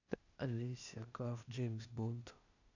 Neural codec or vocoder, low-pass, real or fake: codec, 16 kHz, 0.8 kbps, ZipCodec; 7.2 kHz; fake